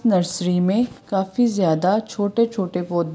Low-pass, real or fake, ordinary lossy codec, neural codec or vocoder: none; real; none; none